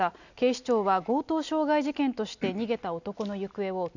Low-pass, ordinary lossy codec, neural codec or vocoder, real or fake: 7.2 kHz; none; none; real